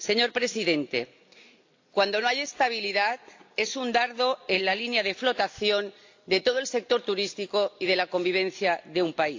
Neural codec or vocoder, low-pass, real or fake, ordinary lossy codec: none; 7.2 kHz; real; AAC, 48 kbps